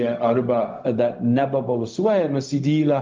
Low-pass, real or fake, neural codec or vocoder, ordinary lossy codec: 7.2 kHz; fake; codec, 16 kHz, 0.4 kbps, LongCat-Audio-Codec; Opus, 32 kbps